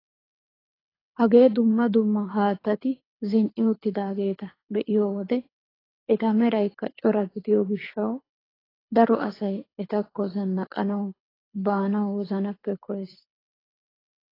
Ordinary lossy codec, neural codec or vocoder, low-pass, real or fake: AAC, 24 kbps; codec, 24 kHz, 6 kbps, HILCodec; 5.4 kHz; fake